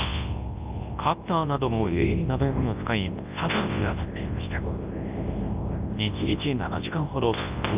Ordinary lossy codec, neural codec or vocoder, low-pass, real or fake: Opus, 32 kbps; codec, 24 kHz, 0.9 kbps, WavTokenizer, large speech release; 3.6 kHz; fake